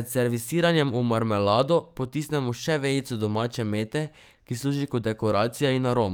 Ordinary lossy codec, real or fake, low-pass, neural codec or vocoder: none; fake; none; codec, 44.1 kHz, 7.8 kbps, DAC